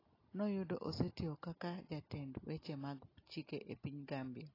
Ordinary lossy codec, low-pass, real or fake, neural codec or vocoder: AAC, 24 kbps; 5.4 kHz; real; none